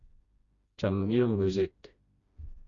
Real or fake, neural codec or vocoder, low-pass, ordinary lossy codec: fake; codec, 16 kHz, 1 kbps, FreqCodec, smaller model; 7.2 kHz; Opus, 64 kbps